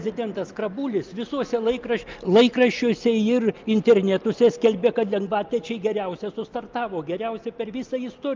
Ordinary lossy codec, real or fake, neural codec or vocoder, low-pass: Opus, 24 kbps; real; none; 7.2 kHz